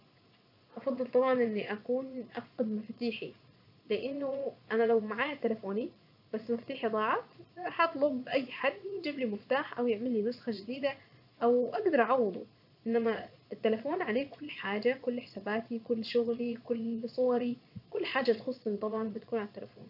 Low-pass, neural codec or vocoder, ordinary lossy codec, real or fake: 5.4 kHz; vocoder, 22.05 kHz, 80 mel bands, Vocos; none; fake